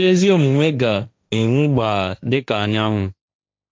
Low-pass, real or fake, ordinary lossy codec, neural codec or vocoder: none; fake; none; codec, 16 kHz, 1.1 kbps, Voila-Tokenizer